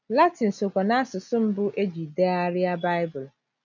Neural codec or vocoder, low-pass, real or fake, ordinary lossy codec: none; 7.2 kHz; real; none